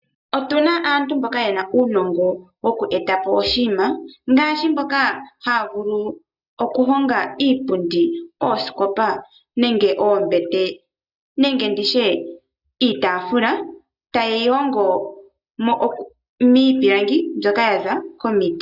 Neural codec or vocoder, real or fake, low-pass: none; real; 5.4 kHz